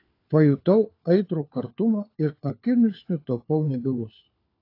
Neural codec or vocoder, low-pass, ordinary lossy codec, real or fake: codec, 16 kHz, 4 kbps, FunCodec, trained on LibriTTS, 50 frames a second; 5.4 kHz; AAC, 32 kbps; fake